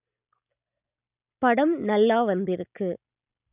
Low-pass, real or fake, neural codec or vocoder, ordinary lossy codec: 3.6 kHz; real; none; none